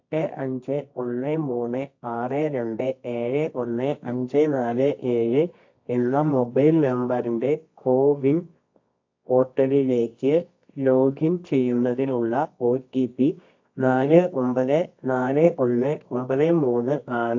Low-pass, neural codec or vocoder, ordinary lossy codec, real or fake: 7.2 kHz; codec, 24 kHz, 0.9 kbps, WavTokenizer, medium music audio release; AAC, 48 kbps; fake